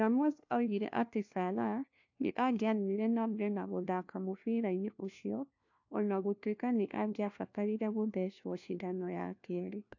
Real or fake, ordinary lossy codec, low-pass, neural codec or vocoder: fake; AAC, 48 kbps; 7.2 kHz; codec, 16 kHz, 1 kbps, FunCodec, trained on LibriTTS, 50 frames a second